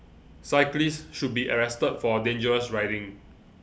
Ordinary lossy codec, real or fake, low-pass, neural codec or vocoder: none; real; none; none